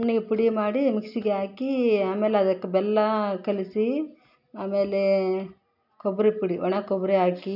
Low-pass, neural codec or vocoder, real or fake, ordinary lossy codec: 5.4 kHz; none; real; none